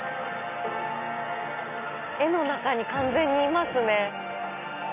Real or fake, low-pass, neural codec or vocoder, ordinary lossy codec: real; 3.6 kHz; none; none